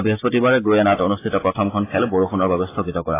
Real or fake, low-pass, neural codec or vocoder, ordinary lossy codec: real; 3.6 kHz; none; AAC, 16 kbps